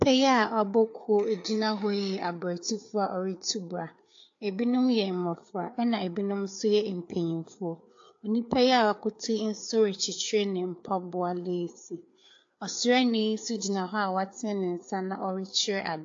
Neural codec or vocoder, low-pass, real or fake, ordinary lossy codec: codec, 16 kHz, 4 kbps, FreqCodec, larger model; 7.2 kHz; fake; AAC, 48 kbps